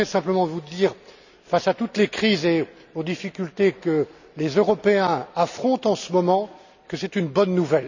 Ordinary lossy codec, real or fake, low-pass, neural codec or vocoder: none; real; 7.2 kHz; none